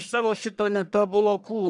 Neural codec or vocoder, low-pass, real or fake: codec, 44.1 kHz, 1.7 kbps, Pupu-Codec; 10.8 kHz; fake